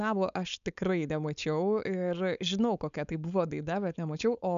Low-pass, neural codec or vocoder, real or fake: 7.2 kHz; codec, 16 kHz, 4.8 kbps, FACodec; fake